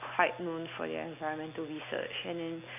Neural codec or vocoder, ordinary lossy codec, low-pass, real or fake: none; none; 3.6 kHz; real